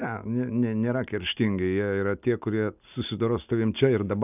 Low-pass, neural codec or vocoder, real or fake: 3.6 kHz; none; real